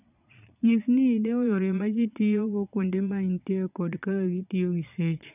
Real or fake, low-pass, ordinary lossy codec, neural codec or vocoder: fake; 3.6 kHz; none; vocoder, 22.05 kHz, 80 mel bands, Vocos